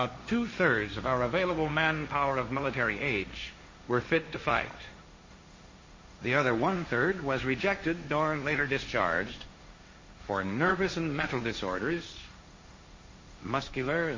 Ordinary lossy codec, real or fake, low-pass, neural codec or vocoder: MP3, 32 kbps; fake; 7.2 kHz; codec, 16 kHz, 1.1 kbps, Voila-Tokenizer